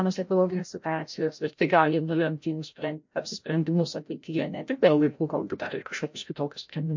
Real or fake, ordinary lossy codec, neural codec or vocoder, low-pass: fake; MP3, 48 kbps; codec, 16 kHz, 0.5 kbps, FreqCodec, larger model; 7.2 kHz